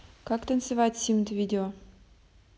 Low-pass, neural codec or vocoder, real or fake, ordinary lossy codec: none; none; real; none